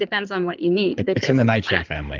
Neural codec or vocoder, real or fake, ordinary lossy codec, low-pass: codec, 16 kHz in and 24 kHz out, 2.2 kbps, FireRedTTS-2 codec; fake; Opus, 32 kbps; 7.2 kHz